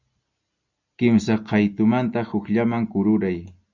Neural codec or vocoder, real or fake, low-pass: none; real; 7.2 kHz